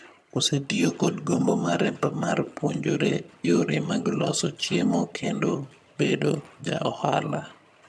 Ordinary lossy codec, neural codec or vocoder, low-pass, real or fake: none; vocoder, 22.05 kHz, 80 mel bands, HiFi-GAN; none; fake